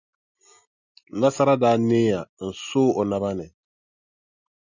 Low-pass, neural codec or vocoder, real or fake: 7.2 kHz; none; real